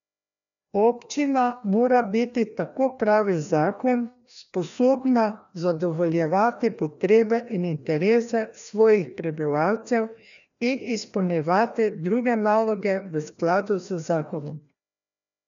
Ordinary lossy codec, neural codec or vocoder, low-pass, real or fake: none; codec, 16 kHz, 1 kbps, FreqCodec, larger model; 7.2 kHz; fake